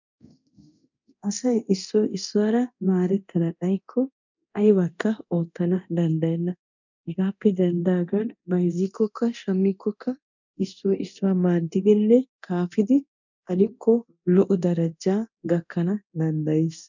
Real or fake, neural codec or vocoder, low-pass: fake; codec, 24 kHz, 0.9 kbps, DualCodec; 7.2 kHz